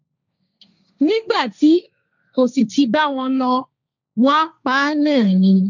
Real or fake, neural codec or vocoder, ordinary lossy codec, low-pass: fake; codec, 16 kHz, 1.1 kbps, Voila-Tokenizer; none; 7.2 kHz